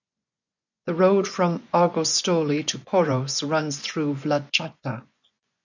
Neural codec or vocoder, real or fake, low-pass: vocoder, 24 kHz, 100 mel bands, Vocos; fake; 7.2 kHz